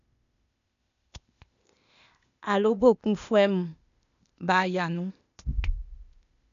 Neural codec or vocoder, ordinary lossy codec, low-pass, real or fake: codec, 16 kHz, 0.8 kbps, ZipCodec; none; 7.2 kHz; fake